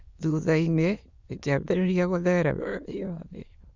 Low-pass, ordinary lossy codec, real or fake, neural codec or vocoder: 7.2 kHz; Opus, 64 kbps; fake; autoencoder, 22.05 kHz, a latent of 192 numbers a frame, VITS, trained on many speakers